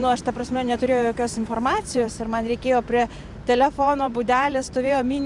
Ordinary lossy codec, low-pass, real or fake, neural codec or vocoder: MP3, 96 kbps; 10.8 kHz; fake; vocoder, 44.1 kHz, 128 mel bands every 512 samples, BigVGAN v2